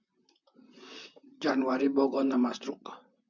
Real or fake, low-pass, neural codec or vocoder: fake; 7.2 kHz; vocoder, 44.1 kHz, 128 mel bands, Pupu-Vocoder